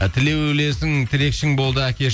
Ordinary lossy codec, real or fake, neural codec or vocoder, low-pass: none; real; none; none